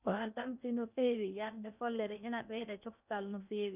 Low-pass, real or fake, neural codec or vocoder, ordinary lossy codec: 3.6 kHz; fake; codec, 16 kHz in and 24 kHz out, 0.6 kbps, FocalCodec, streaming, 4096 codes; none